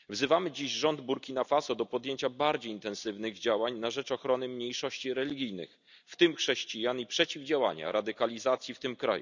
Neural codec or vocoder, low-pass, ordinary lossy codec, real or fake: none; 7.2 kHz; none; real